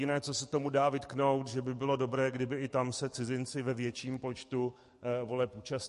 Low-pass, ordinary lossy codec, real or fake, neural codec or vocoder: 14.4 kHz; MP3, 48 kbps; fake; codec, 44.1 kHz, 7.8 kbps, DAC